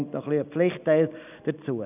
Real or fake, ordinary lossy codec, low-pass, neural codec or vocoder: real; none; 3.6 kHz; none